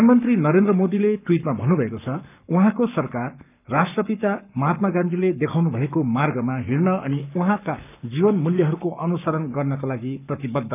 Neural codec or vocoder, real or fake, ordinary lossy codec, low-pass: codec, 44.1 kHz, 7.8 kbps, Pupu-Codec; fake; none; 3.6 kHz